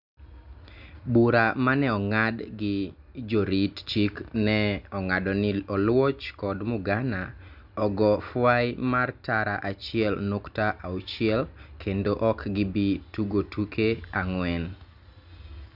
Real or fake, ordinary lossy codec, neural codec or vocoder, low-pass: real; none; none; 5.4 kHz